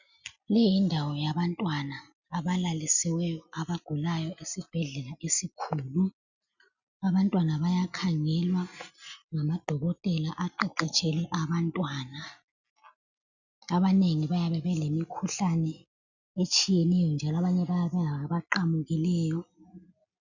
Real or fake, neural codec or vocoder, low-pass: real; none; 7.2 kHz